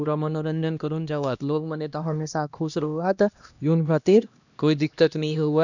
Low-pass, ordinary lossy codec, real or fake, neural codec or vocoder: 7.2 kHz; none; fake; codec, 16 kHz, 1 kbps, X-Codec, HuBERT features, trained on LibriSpeech